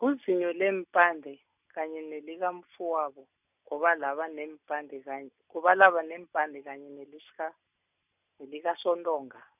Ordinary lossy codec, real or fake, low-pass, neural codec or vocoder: none; real; 3.6 kHz; none